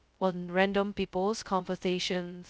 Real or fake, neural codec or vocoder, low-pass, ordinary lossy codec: fake; codec, 16 kHz, 0.2 kbps, FocalCodec; none; none